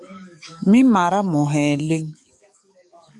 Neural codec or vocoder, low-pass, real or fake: codec, 44.1 kHz, 7.8 kbps, DAC; 10.8 kHz; fake